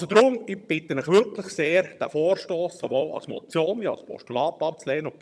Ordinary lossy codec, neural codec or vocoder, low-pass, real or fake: none; vocoder, 22.05 kHz, 80 mel bands, HiFi-GAN; none; fake